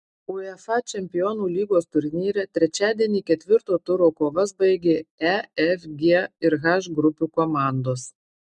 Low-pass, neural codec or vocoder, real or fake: 10.8 kHz; none; real